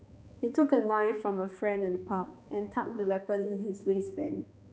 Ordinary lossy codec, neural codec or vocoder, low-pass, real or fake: none; codec, 16 kHz, 2 kbps, X-Codec, HuBERT features, trained on balanced general audio; none; fake